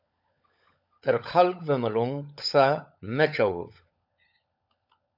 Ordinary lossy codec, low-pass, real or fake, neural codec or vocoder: AAC, 48 kbps; 5.4 kHz; fake; codec, 16 kHz, 16 kbps, FunCodec, trained on LibriTTS, 50 frames a second